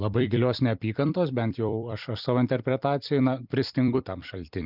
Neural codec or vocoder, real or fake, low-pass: vocoder, 44.1 kHz, 128 mel bands every 256 samples, BigVGAN v2; fake; 5.4 kHz